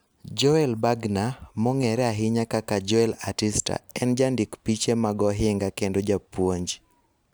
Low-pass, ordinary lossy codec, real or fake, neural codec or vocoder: none; none; real; none